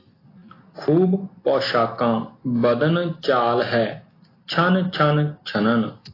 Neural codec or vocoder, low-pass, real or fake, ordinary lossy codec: none; 5.4 kHz; real; AAC, 24 kbps